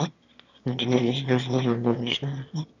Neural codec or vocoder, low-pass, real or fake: autoencoder, 22.05 kHz, a latent of 192 numbers a frame, VITS, trained on one speaker; 7.2 kHz; fake